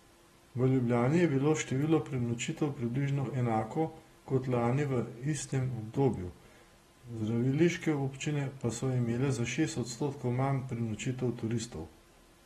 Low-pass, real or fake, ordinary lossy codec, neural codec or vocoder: 19.8 kHz; real; AAC, 32 kbps; none